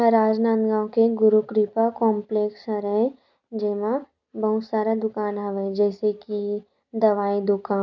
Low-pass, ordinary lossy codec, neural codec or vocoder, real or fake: 7.2 kHz; none; none; real